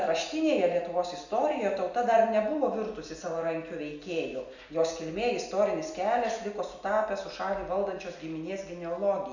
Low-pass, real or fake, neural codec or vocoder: 7.2 kHz; real; none